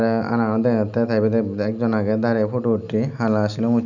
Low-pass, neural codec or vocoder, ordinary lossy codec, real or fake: 7.2 kHz; none; none; real